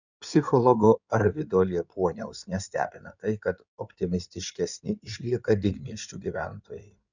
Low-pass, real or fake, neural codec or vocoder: 7.2 kHz; fake; codec, 16 kHz in and 24 kHz out, 2.2 kbps, FireRedTTS-2 codec